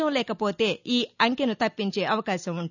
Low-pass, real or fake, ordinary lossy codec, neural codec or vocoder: 7.2 kHz; real; none; none